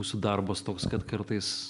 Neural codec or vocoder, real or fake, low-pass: none; real; 10.8 kHz